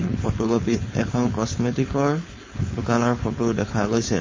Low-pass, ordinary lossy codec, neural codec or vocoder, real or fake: 7.2 kHz; MP3, 32 kbps; codec, 16 kHz, 4.8 kbps, FACodec; fake